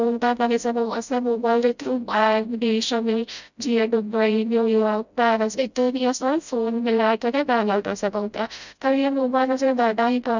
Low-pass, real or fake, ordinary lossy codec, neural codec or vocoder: 7.2 kHz; fake; none; codec, 16 kHz, 0.5 kbps, FreqCodec, smaller model